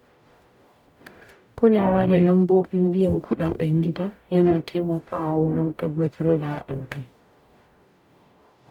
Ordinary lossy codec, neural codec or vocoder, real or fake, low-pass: none; codec, 44.1 kHz, 0.9 kbps, DAC; fake; 19.8 kHz